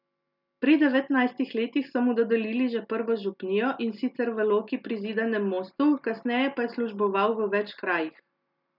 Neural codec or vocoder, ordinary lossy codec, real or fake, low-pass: none; none; real; 5.4 kHz